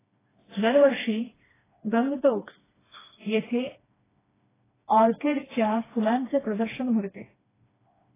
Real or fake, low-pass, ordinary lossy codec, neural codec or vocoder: fake; 3.6 kHz; AAC, 16 kbps; codec, 16 kHz, 2 kbps, FreqCodec, smaller model